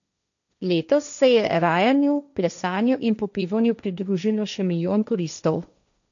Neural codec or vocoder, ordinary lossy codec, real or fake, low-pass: codec, 16 kHz, 1.1 kbps, Voila-Tokenizer; none; fake; 7.2 kHz